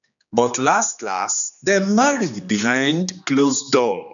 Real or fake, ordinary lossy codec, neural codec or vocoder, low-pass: fake; none; codec, 16 kHz, 2 kbps, X-Codec, HuBERT features, trained on general audio; 7.2 kHz